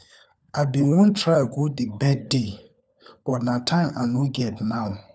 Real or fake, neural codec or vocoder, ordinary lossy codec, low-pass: fake; codec, 16 kHz, 4 kbps, FunCodec, trained on LibriTTS, 50 frames a second; none; none